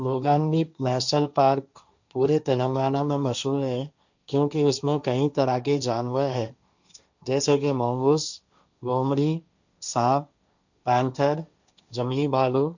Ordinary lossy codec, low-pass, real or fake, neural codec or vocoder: none; 7.2 kHz; fake; codec, 16 kHz, 1.1 kbps, Voila-Tokenizer